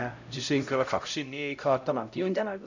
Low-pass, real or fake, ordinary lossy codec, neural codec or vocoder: 7.2 kHz; fake; none; codec, 16 kHz, 0.5 kbps, X-Codec, HuBERT features, trained on LibriSpeech